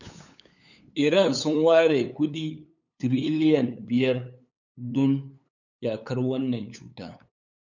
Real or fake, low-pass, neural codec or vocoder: fake; 7.2 kHz; codec, 16 kHz, 8 kbps, FunCodec, trained on LibriTTS, 25 frames a second